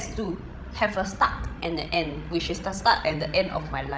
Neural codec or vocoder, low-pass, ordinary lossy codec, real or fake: codec, 16 kHz, 16 kbps, FreqCodec, larger model; none; none; fake